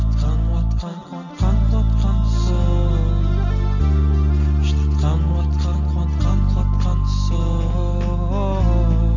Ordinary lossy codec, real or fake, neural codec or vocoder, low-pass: none; real; none; 7.2 kHz